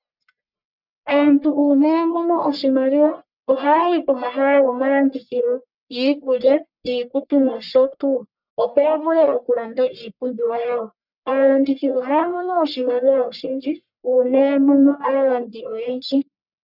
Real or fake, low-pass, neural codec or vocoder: fake; 5.4 kHz; codec, 44.1 kHz, 1.7 kbps, Pupu-Codec